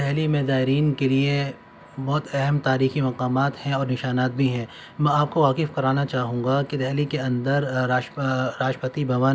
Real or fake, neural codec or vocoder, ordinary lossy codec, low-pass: real; none; none; none